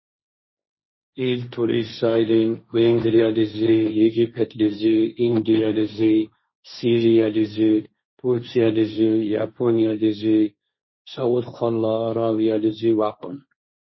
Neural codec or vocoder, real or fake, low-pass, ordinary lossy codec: codec, 16 kHz, 1.1 kbps, Voila-Tokenizer; fake; 7.2 kHz; MP3, 24 kbps